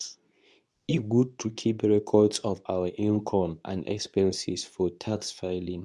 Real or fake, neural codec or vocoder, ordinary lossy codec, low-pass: fake; codec, 24 kHz, 0.9 kbps, WavTokenizer, medium speech release version 2; none; none